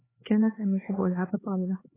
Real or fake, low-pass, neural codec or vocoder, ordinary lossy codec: fake; 3.6 kHz; codec, 16 kHz, 4 kbps, X-Codec, HuBERT features, trained on LibriSpeech; AAC, 16 kbps